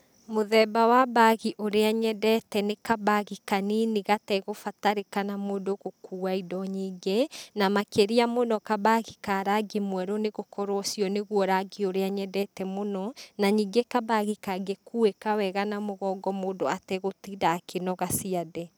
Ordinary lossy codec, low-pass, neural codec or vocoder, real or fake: none; none; none; real